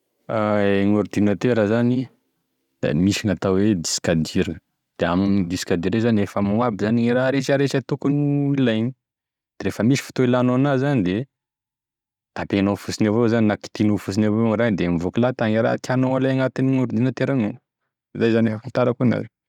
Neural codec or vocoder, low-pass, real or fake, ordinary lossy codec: none; 19.8 kHz; real; none